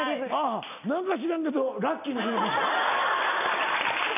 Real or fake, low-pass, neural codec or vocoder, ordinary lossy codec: real; 3.6 kHz; none; none